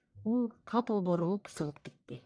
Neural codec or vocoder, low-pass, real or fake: codec, 44.1 kHz, 1.7 kbps, Pupu-Codec; 9.9 kHz; fake